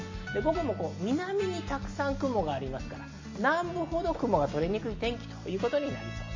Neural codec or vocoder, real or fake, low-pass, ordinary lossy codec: none; real; 7.2 kHz; MP3, 32 kbps